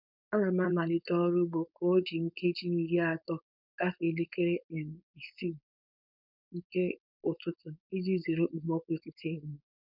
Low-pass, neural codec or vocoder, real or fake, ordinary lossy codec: 5.4 kHz; codec, 16 kHz, 4.8 kbps, FACodec; fake; none